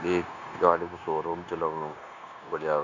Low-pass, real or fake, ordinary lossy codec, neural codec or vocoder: 7.2 kHz; fake; none; codec, 16 kHz, 0.9 kbps, LongCat-Audio-Codec